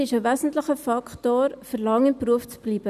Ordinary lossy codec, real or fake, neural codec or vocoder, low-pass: none; real; none; 14.4 kHz